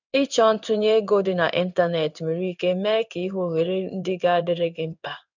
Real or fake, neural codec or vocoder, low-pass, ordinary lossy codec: fake; codec, 16 kHz in and 24 kHz out, 1 kbps, XY-Tokenizer; 7.2 kHz; none